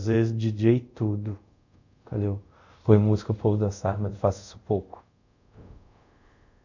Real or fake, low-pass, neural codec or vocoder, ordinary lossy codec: fake; 7.2 kHz; codec, 24 kHz, 0.5 kbps, DualCodec; none